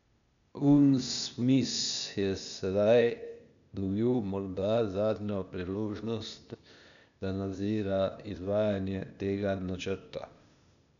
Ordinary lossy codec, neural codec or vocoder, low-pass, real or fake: none; codec, 16 kHz, 0.8 kbps, ZipCodec; 7.2 kHz; fake